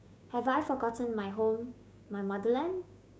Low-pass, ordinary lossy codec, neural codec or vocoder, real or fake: none; none; codec, 16 kHz, 6 kbps, DAC; fake